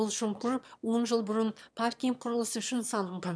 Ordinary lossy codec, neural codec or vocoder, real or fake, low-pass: none; autoencoder, 22.05 kHz, a latent of 192 numbers a frame, VITS, trained on one speaker; fake; none